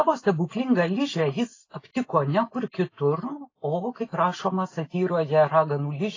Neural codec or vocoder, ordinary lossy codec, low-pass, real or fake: none; AAC, 32 kbps; 7.2 kHz; real